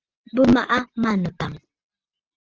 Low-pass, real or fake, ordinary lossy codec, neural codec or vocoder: 7.2 kHz; real; Opus, 16 kbps; none